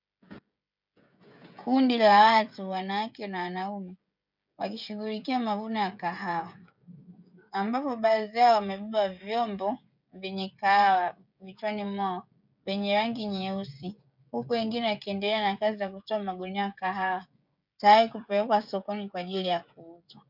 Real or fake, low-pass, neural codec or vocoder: fake; 5.4 kHz; codec, 16 kHz, 16 kbps, FreqCodec, smaller model